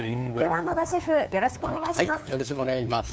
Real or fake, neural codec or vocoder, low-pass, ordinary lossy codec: fake; codec, 16 kHz, 2 kbps, FunCodec, trained on LibriTTS, 25 frames a second; none; none